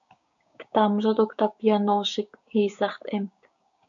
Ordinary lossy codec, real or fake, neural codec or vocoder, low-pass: AAC, 64 kbps; fake; codec, 16 kHz, 6 kbps, DAC; 7.2 kHz